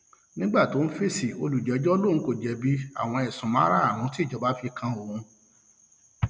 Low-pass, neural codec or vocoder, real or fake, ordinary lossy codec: none; none; real; none